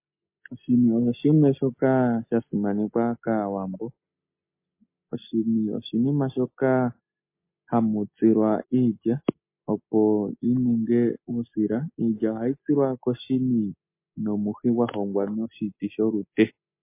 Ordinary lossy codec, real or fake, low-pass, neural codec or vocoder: MP3, 24 kbps; real; 3.6 kHz; none